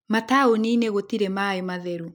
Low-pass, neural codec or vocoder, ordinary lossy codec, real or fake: 19.8 kHz; none; none; real